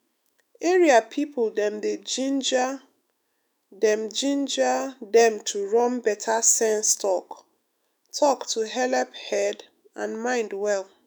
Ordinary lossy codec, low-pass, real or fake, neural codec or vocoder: none; none; fake; autoencoder, 48 kHz, 128 numbers a frame, DAC-VAE, trained on Japanese speech